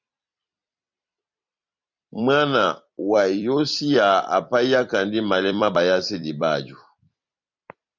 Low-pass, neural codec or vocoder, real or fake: 7.2 kHz; vocoder, 44.1 kHz, 128 mel bands every 256 samples, BigVGAN v2; fake